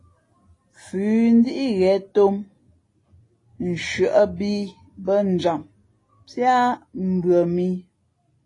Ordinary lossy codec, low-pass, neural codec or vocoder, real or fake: AAC, 32 kbps; 10.8 kHz; none; real